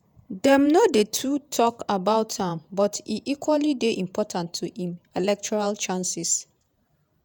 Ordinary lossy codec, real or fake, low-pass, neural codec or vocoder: none; fake; none; vocoder, 48 kHz, 128 mel bands, Vocos